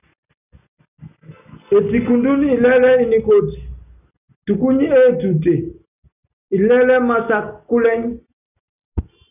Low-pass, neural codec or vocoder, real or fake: 3.6 kHz; none; real